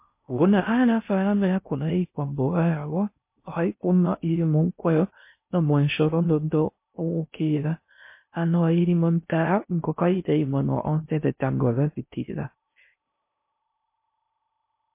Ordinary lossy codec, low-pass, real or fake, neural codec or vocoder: MP3, 24 kbps; 3.6 kHz; fake; codec, 16 kHz in and 24 kHz out, 0.6 kbps, FocalCodec, streaming, 2048 codes